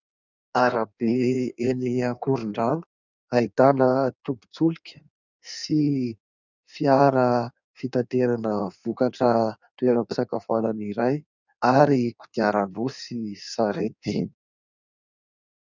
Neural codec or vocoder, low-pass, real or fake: codec, 16 kHz in and 24 kHz out, 1.1 kbps, FireRedTTS-2 codec; 7.2 kHz; fake